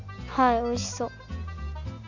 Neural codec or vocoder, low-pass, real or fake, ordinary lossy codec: none; 7.2 kHz; real; none